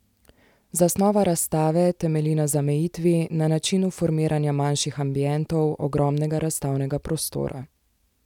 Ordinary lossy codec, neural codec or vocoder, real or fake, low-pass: none; none; real; 19.8 kHz